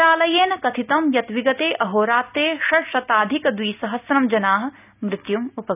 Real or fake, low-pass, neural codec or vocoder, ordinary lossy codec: real; 3.6 kHz; none; none